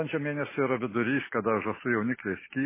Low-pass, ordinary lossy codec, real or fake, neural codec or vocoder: 3.6 kHz; MP3, 16 kbps; real; none